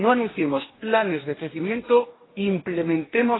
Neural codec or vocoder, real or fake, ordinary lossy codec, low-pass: codec, 44.1 kHz, 2.6 kbps, DAC; fake; AAC, 16 kbps; 7.2 kHz